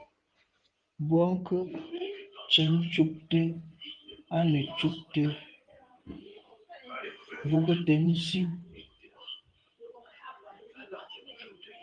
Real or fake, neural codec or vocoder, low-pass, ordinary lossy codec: fake; codec, 16 kHz in and 24 kHz out, 2.2 kbps, FireRedTTS-2 codec; 9.9 kHz; Opus, 24 kbps